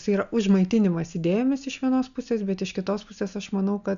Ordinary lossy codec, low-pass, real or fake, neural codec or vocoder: MP3, 96 kbps; 7.2 kHz; real; none